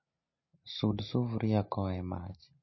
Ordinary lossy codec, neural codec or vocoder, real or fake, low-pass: MP3, 24 kbps; none; real; 7.2 kHz